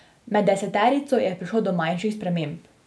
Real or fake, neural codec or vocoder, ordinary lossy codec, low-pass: real; none; none; none